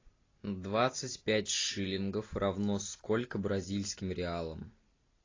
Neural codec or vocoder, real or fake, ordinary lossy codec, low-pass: none; real; AAC, 32 kbps; 7.2 kHz